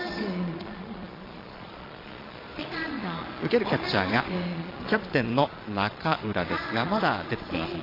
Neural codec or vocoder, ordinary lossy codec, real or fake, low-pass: vocoder, 22.05 kHz, 80 mel bands, Vocos; AAC, 24 kbps; fake; 5.4 kHz